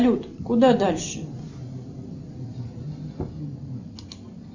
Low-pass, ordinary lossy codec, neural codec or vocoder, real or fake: 7.2 kHz; Opus, 64 kbps; none; real